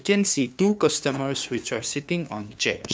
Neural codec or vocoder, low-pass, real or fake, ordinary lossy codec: codec, 16 kHz, 2 kbps, FunCodec, trained on LibriTTS, 25 frames a second; none; fake; none